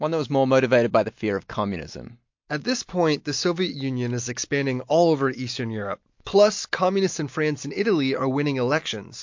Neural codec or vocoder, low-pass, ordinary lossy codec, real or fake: none; 7.2 kHz; MP3, 48 kbps; real